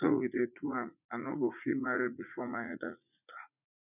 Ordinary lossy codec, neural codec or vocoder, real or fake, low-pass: none; vocoder, 44.1 kHz, 80 mel bands, Vocos; fake; 3.6 kHz